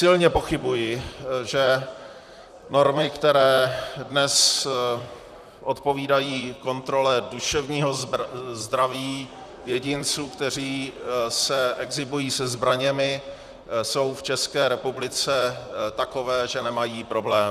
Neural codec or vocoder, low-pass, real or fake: vocoder, 44.1 kHz, 128 mel bands, Pupu-Vocoder; 14.4 kHz; fake